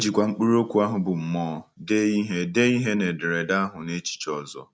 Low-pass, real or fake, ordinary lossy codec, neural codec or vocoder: none; real; none; none